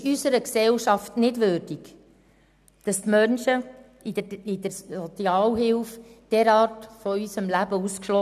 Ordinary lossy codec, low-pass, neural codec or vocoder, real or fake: none; 14.4 kHz; none; real